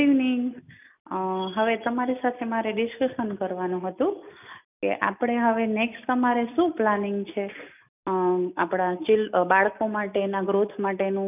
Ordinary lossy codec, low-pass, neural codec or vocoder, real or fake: none; 3.6 kHz; none; real